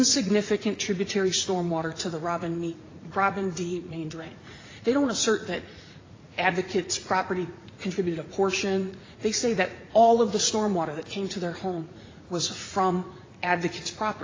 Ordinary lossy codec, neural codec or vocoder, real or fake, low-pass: AAC, 32 kbps; vocoder, 44.1 kHz, 80 mel bands, Vocos; fake; 7.2 kHz